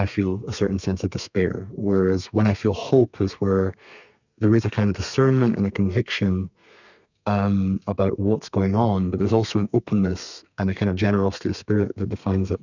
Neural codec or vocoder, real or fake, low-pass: codec, 32 kHz, 1.9 kbps, SNAC; fake; 7.2 kHz